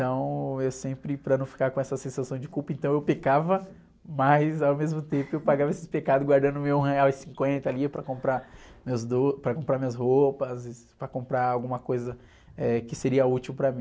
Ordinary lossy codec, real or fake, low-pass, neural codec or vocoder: none; real; none; none